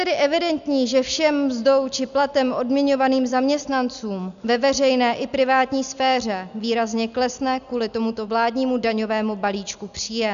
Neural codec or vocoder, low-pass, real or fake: none; 7.2 kHz; real